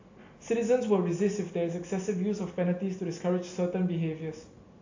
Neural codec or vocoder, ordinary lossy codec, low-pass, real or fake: none; AAC, 48 kbps; 7.2 kHz; real